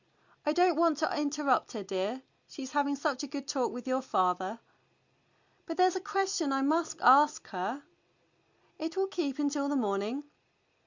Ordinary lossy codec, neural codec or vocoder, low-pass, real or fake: Opus, 64 kbps; none; 7.2 kHz; real